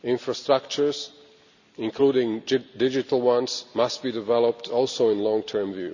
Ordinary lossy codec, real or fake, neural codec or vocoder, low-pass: none; real; none; 7.2 kHz